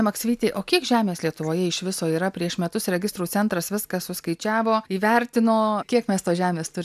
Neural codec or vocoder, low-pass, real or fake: none; 14.4 kHz; real